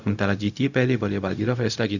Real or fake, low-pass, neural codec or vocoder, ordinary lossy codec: fake; 7.2 kHz; codec, 16 kHz, 0.4 kbps, LongCat-Audio-Codec; none